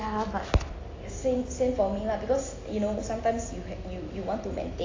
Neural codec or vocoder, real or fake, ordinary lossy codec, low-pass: none; real; AAC, 32 kbps; 7.2 kHz